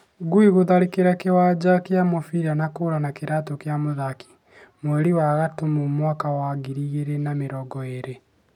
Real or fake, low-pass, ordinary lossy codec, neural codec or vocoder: real; 19.8 kHz; none; none